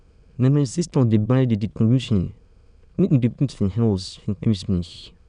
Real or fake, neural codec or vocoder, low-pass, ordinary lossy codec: fake; autoencoder, 22.05 kHz, a latent of 192 numbers a frame, VITS, trained on many speakers; 9.9 kHz; none